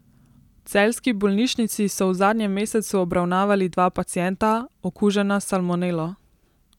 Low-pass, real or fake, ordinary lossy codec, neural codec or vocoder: 19.8 kHz; real; none; none